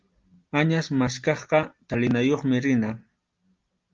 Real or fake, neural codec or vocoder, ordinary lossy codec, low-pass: real; none; Opus, 32 kbps; 7.2 kHz